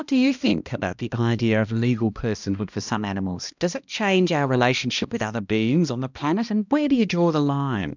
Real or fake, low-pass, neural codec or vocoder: fake; 7.2 kHz; codec, 16 kHz, 1 kbps, X-Codec, HuBERT features, trained on balanced general audio